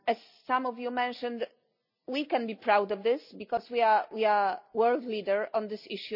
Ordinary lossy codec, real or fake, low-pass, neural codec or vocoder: none; real; 5.4 kHz; none